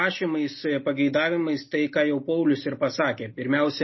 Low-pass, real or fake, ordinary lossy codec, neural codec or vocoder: 7.2 kHz; real; MP3, 24 kbps; none